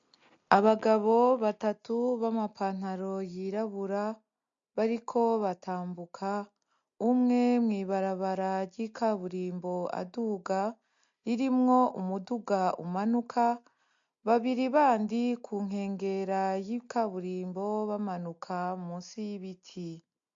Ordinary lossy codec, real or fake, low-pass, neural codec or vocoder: MP3, 48 kbps; real; 7.2 kHz; none